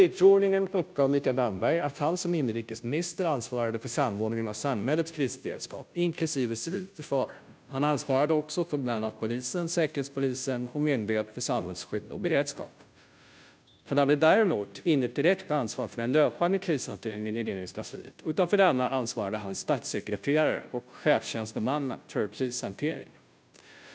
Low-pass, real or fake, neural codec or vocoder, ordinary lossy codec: none; fake; codec, 16 kHz, 0.5 kbps, FunCodec, trained on Chinese and English, 25 frames a second; none